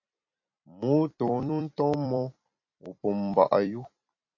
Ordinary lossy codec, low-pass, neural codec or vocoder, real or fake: MP3, 32 kbps; 7.2 kHz; vocoder, 44.1 kHz, 128 mel bands every 256 samples, BigVGAN v2; fake